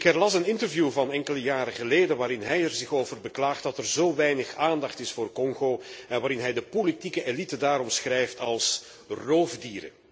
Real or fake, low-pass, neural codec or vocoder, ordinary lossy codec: real; none; none; none